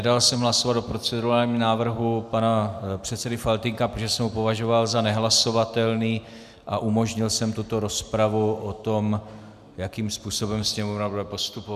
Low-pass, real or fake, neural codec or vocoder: 14.4 kHz; real; none